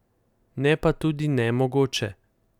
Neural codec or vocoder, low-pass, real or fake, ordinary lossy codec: none; 19.8 kHz; real; none